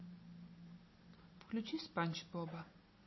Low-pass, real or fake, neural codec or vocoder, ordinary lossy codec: 7.2 kHz; real; none; MP3, 24 kbps